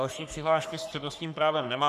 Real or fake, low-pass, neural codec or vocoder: fake; 14.4 kHz; codec, 44.1 kHz, 3.4 kbps, Pupu-Codec